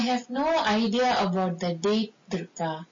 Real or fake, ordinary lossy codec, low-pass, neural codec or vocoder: real; MP3, 32 kbps; 7.2 kHz; none